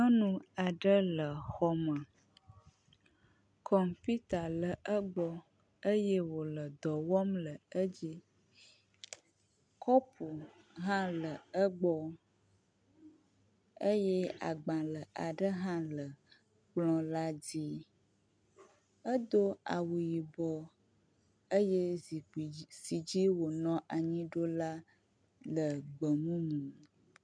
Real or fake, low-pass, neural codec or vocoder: real; 9.9 kHz; none